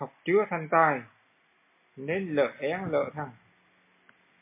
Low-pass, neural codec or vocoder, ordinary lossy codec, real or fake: 3.6 kHz; none; MP3, 24 kbps; real